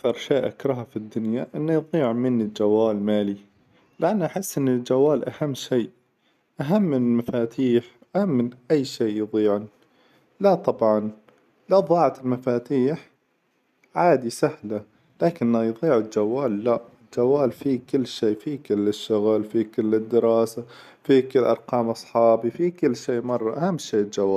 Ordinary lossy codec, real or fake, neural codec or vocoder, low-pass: none; real; none; 14.4 kHz